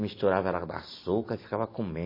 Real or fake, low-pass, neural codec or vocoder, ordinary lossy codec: real; 5.4 kHz; none; MP3, 24 kbps